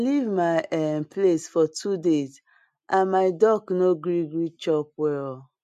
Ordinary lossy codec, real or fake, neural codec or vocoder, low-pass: MP3, 64 kbps; real; none; 14.4 kHz